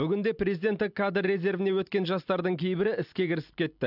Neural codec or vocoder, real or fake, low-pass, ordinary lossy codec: none; real; 5.4 kHz; AAC, 48 kbps